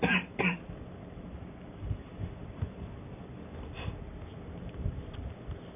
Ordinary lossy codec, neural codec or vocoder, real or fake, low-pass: AAC, 32 kbps; none; real; 3.6 kHz